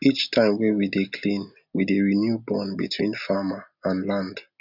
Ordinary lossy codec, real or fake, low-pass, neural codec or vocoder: none; real; 5.4 kHz; none